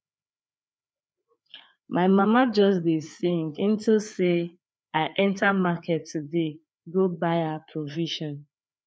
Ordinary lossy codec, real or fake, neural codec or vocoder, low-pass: none; fake; codec, 16 kHz, 4 kbps, FreqCodec, larger model; none